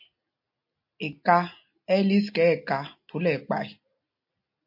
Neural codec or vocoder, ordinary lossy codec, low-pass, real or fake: none; MP3, 32 kbps; 5.4 kHz; real